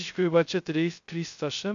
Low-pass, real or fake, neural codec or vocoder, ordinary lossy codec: 7.2 kHz; fake; codec, 16 kHz, 0.2 kbps, FocalCodec; AAC, 64 kbps